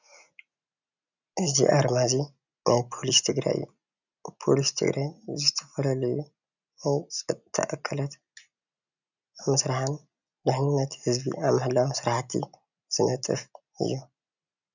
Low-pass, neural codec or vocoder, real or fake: 7.2 kHz; none; real